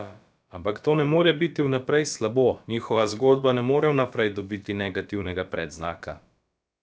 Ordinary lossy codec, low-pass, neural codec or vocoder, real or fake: none; none; codec, 16 kHz, about 1 kbps, DyCAST, with the encoder's durations; fake